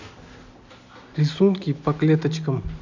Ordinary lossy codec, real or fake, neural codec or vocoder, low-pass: none; real; none; 7.2 kHz